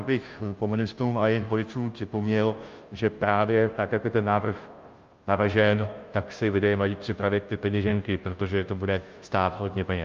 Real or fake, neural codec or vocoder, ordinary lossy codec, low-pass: fake; codec, 16 kHz, 0.5 kbps, FunCodec, trained on Chinese and English, 25 frames a second; Opus, 32 kbps; 7.2 kHz